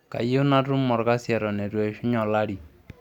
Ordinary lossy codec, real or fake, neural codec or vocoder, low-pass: none; real; none; 19.8 kHz